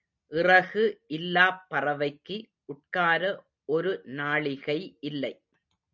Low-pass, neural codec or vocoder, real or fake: 7.2 kHz; none; real